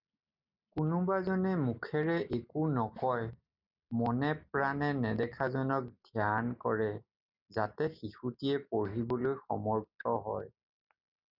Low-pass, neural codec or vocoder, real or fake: 5.4 kHz; none; real